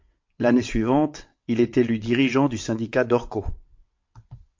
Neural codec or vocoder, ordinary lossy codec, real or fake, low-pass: vocoder, 22.05 kHz, 80 mel bands, WaveNeXt; MP3, 48 kbps; fake; 7.2 kHz